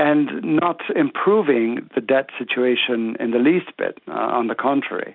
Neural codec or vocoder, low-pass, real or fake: none; 5.4 kHz; real